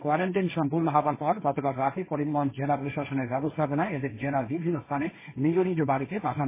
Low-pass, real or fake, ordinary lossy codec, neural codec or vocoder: 3.6 kHz; fake; MP3, 16 kbps; codec, 16 kHz, 1.1 kbps, Voila-Tokenizer